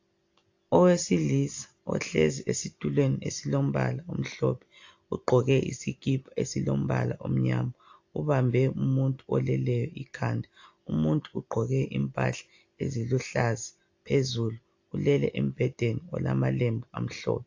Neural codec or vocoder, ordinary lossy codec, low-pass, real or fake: none; AAC, 48 kbps; 7.2 kHz; real